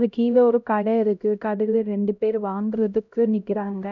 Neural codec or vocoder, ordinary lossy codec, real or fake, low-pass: codec, 16 kHz, 0.5 kbps, X-Codec, HuBERT features, trained on LibriSpeech; none; fake; 7.2 kHz